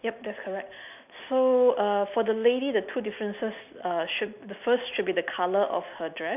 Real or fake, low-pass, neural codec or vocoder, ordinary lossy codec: real; 3.6 kHz; none; none